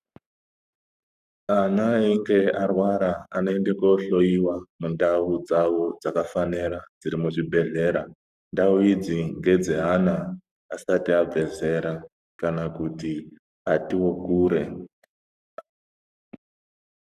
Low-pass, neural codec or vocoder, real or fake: 14.4 kHz; codec, 44.1 kHz, 7.8 kbps, Pupu-Codec; fake